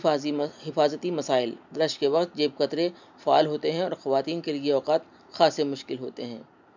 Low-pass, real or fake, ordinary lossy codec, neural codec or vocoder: 7.2 kHz; real; none; none